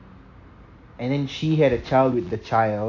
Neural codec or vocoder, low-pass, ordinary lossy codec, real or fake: none; 7.2 kHz; AAC, 48 kbps; real